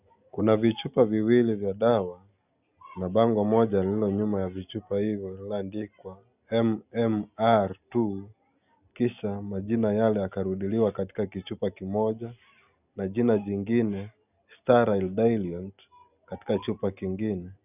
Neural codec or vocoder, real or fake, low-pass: none; real; 3.6 kHz